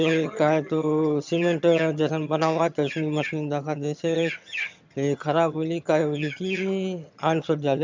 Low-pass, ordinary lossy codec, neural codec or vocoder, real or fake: 7.2 kHz; MP3, 64 kbps; vocoder, 22.05 kHz, 80 mel bands, HiFi-GAN; fake